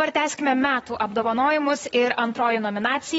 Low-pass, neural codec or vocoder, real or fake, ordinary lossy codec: 19.8 kHz; vocoder, 44.1 kHz, 128 mel bands, Pupu-Vocoder; fake; AAC, 24 kbps